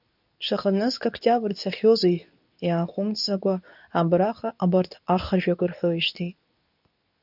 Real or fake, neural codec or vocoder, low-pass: fake; codec, 24 kHz, 0.9 kbps, WavTokenizer, medium speech release version 2; 5.4 kHz